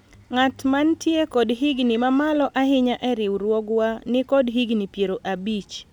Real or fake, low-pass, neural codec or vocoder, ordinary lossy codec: real; 19.8 kHz; none; none